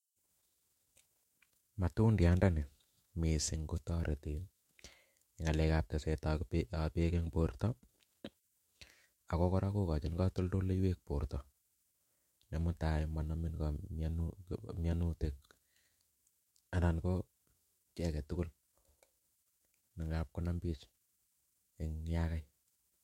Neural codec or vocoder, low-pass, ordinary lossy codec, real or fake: autoencoder, 48 kHz, 128 numbers a frame, DAC-VAE, trained on Japanese speech; 19.8 kHz; MP3, 64 kbps; fake